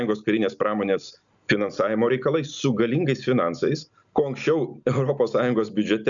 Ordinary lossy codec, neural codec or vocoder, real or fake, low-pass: MP3, 96 kbps; none; real; 7.2 kHz